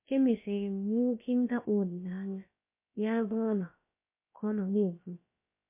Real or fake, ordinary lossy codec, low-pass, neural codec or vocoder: fake; MP3, 24 kbps; 3.6 kHz; codec, 16 kHz, about 1 kbps, DyCAST, with the encoder's durations